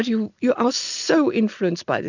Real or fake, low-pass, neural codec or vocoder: real; 7.2 kHz; none